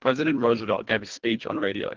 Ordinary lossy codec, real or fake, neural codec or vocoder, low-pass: Opus, 16 kbps; fake; codec, 24 kHz, 1.5 kbps, HILCodec; 7.2 kHz